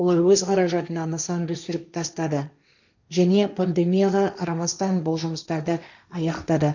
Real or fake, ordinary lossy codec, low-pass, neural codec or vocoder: fake; none; 7.2 kHz; codec, 16 kHz, 1.1 kbps, Voila-Tokenizer